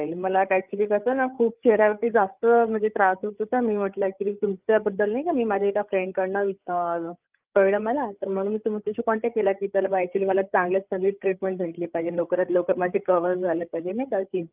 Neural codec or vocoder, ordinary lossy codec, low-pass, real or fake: codec, 16 kHz, 8 kbps, FreqCodec, larger model; Opus, 32 kbps; 3.6 kHz; fake